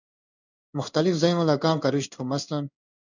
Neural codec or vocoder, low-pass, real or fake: codec, 16 kHz in and 24 kHz out, 1 kbps, XY-Tokenizer; 7.2 kHz; fake